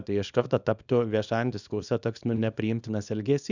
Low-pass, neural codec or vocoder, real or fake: 7.2 kHz; codec, 24 kHz, 0.9 kbps, WavTokenizer, small release; fake